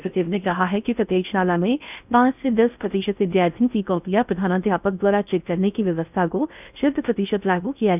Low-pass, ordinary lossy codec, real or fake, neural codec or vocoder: 3.6 kHz; none; fake; codec, 16 kHz in and 24 kHz out, 0.6 kbps, FocalCodec, streaming, 4096 codes